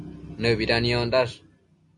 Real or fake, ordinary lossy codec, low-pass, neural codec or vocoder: real; MP3, 64 kbps; 10.8 kHz; none